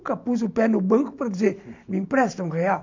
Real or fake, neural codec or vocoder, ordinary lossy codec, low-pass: real; none; MP3, 64 kbps; 7.2 kHz